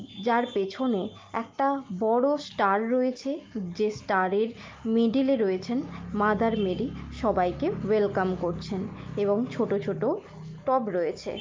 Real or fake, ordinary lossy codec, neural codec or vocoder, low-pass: real; Opus, 24 kbps; none; 7.2 kHz